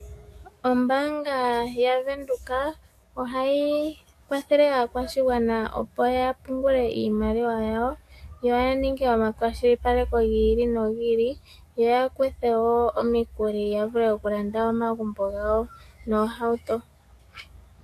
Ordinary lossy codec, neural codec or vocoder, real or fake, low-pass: AAC, 64 kbps; codec, 44.1 kHz, 7.8 kbps, DAC; fake; 14.4 kHz